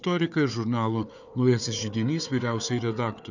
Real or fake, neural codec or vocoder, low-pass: fake; codec, 16 kHz, 4 kbps, FunCodec, trained on Chinese and English, 50 frames a second; 7.2 kHz